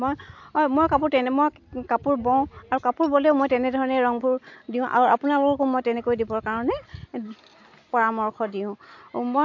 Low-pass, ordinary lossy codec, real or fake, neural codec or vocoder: 7.2 kHz; AAC, 48 kbps; real; none